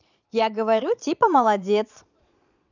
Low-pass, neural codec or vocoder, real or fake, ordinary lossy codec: 7.2 kHz; vocoder, 22.05 kHz, 80 mel bands, Vocos; fake; none